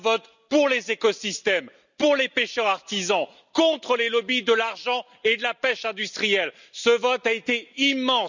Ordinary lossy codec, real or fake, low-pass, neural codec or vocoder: none; real; 7.2 kHz; none